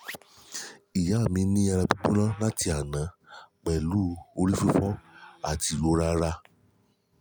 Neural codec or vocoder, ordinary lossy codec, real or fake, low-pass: none; none; real; none